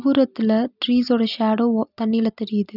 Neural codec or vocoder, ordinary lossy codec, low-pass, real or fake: none; none; 5.4 kHz; real